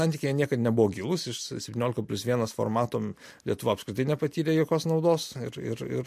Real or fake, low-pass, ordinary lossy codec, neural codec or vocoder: real; 14.4 kHz; MP3, 64 kbps; none